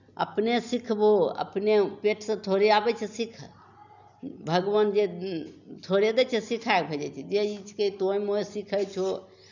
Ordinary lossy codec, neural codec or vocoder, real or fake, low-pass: none; none; real; 7.2 kHz